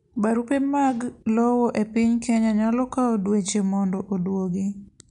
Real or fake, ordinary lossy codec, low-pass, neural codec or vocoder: real; MP3, 64 kbps; 10.8 kHz; none